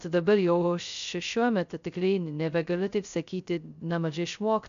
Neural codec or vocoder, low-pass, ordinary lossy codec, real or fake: codec, 16 kHz, 0.2 kbps, FocalCodec; 7.2 kHz; MP3, 64 kbps; fake